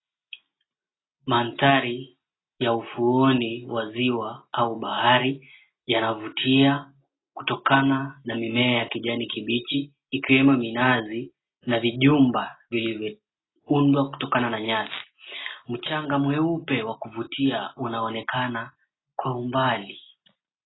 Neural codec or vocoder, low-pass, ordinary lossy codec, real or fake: none; 7.2 kHz; AAC, 16 kbps; real